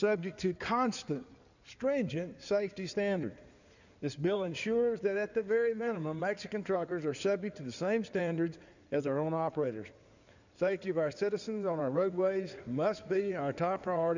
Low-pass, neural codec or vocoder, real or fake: 7.2 kHz; codec, 16 kHz in and 24 kHz out, 2.2 kbps, FireRedTTS-2 codec; fake